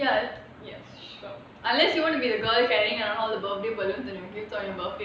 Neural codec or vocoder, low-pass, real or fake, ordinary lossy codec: none; none; real; none